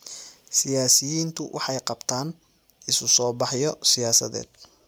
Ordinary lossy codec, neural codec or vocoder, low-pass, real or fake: none; none; none; real